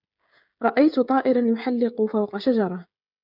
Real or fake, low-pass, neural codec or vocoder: fake; 5.4 kHz; codec, 16 kHz, 16 kbps, FreqCodec, smaller model